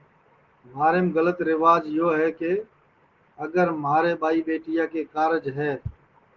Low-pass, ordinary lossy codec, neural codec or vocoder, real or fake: 7.2 kHz; Opus, 16 kbps; none; real